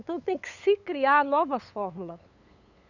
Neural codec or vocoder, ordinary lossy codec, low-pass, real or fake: codec, 16 kHz, 8 kbps, FunCodec, trained on LibriTTS, 25 frames a second; none; 7.2 kHz; fake